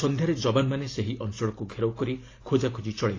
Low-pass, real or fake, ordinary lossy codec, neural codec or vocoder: 7.2 kHz; real; AAC, 32 kbps; none